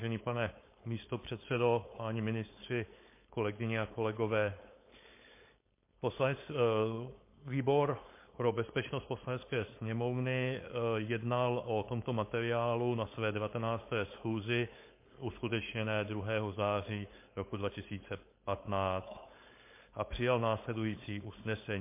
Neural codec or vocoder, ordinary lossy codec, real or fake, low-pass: codec, 16 kHz, 4.8 kbps, FACodec; MP3, 24 kbps; fake; 3.6 kHz